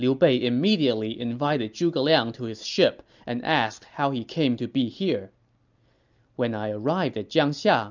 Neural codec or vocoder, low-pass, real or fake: none; 7.2 kHz; real